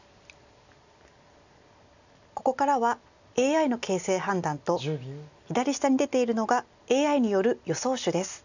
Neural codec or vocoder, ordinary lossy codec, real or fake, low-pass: none; none; real; 7.2 kHz